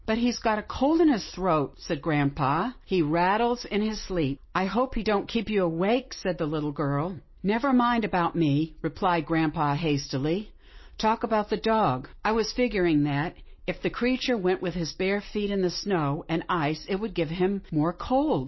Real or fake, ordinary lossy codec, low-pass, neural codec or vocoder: real; MP3, 24 kbps; 7.2 kHz; none